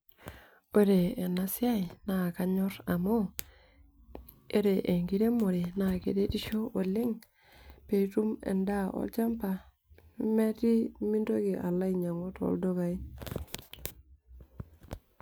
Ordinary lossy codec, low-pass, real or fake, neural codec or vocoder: none; none; real; none